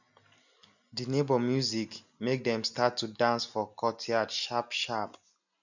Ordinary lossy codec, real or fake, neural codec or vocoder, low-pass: none; real; none; 7.2 kHz